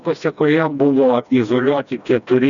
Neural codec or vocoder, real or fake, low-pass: codec, 16 kHz, 1 kbps, FreqCodec, smaller model; fake; 7.2 kHz